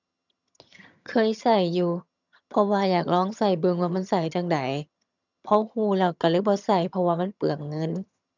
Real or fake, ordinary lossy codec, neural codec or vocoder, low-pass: fake; none; vocoder, 22.05 kHz, 80 mel bands, HiFi-GAN; 7.2 kHz